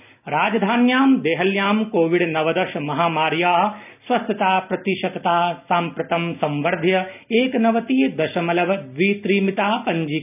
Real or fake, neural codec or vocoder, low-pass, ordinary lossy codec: real; none; 3.6 kHz; none